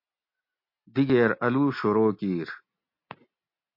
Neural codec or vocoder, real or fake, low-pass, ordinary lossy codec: none; real; 5.4 kHz; MP3, 32 kbps